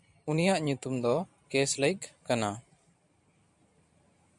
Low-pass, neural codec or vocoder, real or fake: 10.8 kHz; vocoder, 44.1 kHz, 128 mel bands every 512 samples, BigVGAN v2; fake